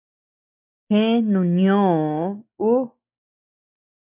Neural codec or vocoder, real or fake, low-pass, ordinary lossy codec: none; real; 3.6 kHz; MP3, 32 kbps